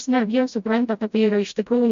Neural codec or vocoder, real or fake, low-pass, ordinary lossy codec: codec, 16 kHz, 0.5 kbps, FreqCodec, smaller model; fake; 7.2 kHz; MP3, 64 kbps